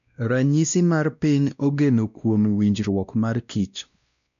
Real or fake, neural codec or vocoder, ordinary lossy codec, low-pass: fake; codec, 16 kHz, 1 kbps, X-Codec, WavLM features, trained on Multilingual LibriSpeech; none; 7.2 kHz